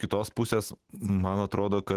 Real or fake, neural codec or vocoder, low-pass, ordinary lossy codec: fake; autoencoder, 48 kHz, 128 numbers a frame, DAC-VAE, trained on Japanese speech; 14.4 kHz; Opus, 16 kbps